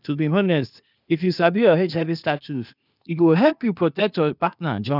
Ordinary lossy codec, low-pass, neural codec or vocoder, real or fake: none; 5.4 kHz; codec, 16 kHz, 0.8 kbps, ZipCodec; fake